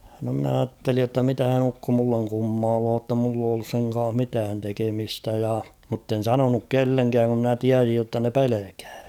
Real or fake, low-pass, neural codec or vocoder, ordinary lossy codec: fake; 19.8 kHz; codec, 44.1 kHz, 7.8 kbps, DAC; none